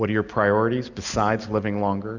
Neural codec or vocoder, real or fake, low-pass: none; real; 7.2 kHz